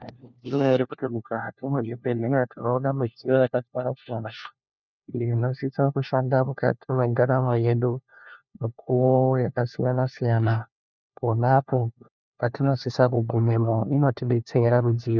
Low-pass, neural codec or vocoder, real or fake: 7.2 kHz; codec, 16 kHz, 1 kbps, FunCodec, trained on LibriTTS, 50 frames a second; fake